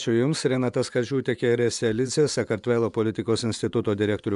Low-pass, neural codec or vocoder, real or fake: 10.8 kHz; vocoder, 24 kHz, 100 mel bands, Vocos; fake